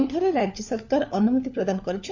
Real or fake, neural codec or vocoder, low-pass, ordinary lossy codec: fake; codec, 16 kHz, 4 kbps, FunCodec, trained on LibriTTS, 50 frames a second; 7.2 kHz; none